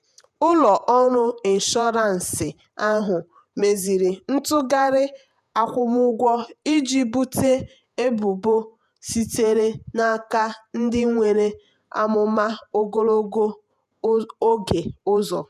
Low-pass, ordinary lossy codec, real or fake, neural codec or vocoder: 14.4 kHz; none; fake; vocoder, 48 kHz, 128 mel bands, Vocos